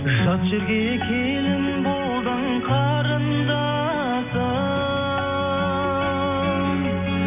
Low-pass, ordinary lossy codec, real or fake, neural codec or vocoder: 3.6 kHz; none; real; none